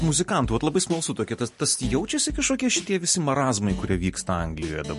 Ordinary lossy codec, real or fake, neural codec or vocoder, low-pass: MP3, 48 kbps; real; none; 14.4 kHz